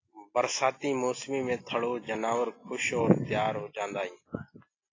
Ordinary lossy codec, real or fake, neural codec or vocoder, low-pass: AAC, 32 kbps; real; none; 7.2 kHz